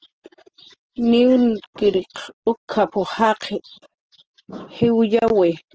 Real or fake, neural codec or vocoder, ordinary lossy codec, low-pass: real; none; Opus, 16 kbps; 7.2 kHz